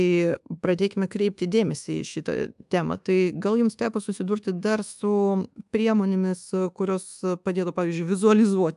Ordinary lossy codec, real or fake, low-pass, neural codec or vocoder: AAC, 96 kbps; fake; 10.8 kHz; codec, 24 kHz, 1.2 kbps, DualCodec